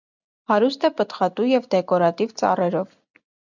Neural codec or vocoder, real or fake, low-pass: none; real; 7.2 kHz